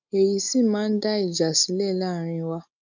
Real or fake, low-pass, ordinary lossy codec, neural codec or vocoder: real; 7.2 kHz; none; none